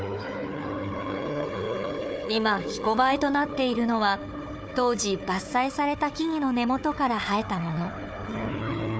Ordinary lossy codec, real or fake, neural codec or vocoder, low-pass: none; fake; codec, 16 kHz, 4 kbps, FunCodec, trained on Chinese and English, 50 frames a second; none